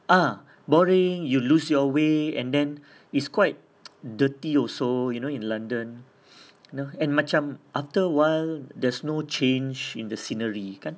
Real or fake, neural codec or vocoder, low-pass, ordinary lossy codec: real; none; none; none